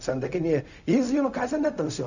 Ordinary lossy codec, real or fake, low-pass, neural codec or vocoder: none; fake; 7.2 kHz; codec, 16 kHz, 0.4 kbps, LongCat-Audio-Codec